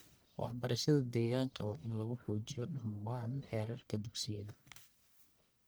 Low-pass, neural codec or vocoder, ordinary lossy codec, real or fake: none; codec, 44.1 kHz, 1.7 kbps, Pupu-Codec; none; fake